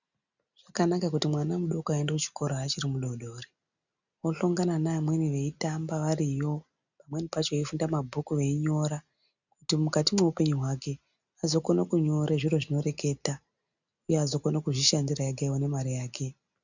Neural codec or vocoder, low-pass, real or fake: none; 7.2 kHz; real